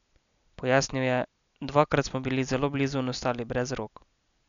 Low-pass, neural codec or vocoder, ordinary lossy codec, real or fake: 7.2 kHz; none; MP3, 96 kbps; real